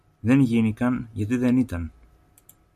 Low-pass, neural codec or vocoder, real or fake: 14.4 kHz; none; real